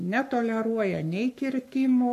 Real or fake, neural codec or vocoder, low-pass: fake; codec, 44.1 kHz, 7.8 kbps, Pupu-Codec; 14.4 kHz